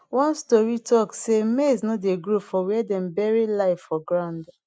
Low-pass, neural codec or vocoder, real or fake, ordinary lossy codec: none; none; real; none